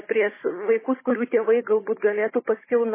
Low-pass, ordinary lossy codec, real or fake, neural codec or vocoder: 3.6 kHz; MP3, 16 kbps; fake; codec, 16 kHz, 16 kbps, FunCodec, trained on Chinese and English, 50 frames a second